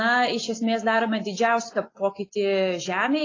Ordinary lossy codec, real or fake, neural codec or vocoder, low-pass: AAC, 32 kbps; real; none; 7.2 kHz